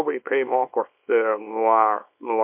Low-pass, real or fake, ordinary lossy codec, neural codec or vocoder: 3.6 kHz; fake; MP3, 32 kbps; codec, 24 kHz, 0.9 kbps, WavTokenizer, small release